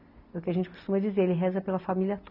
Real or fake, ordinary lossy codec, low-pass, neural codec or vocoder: real; none; 5.4 kHz; none